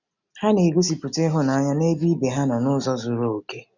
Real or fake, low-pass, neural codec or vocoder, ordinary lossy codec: real; 7.2 kHz; none; none